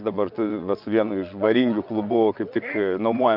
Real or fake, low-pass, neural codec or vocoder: fake; 5.4 kHz; vocoder, 22.05 kHz, 80 mel bands, WaveNeXt